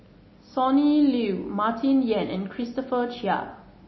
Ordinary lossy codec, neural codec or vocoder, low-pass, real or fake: MP3, 24 kbps; none; 7.2 kHz; real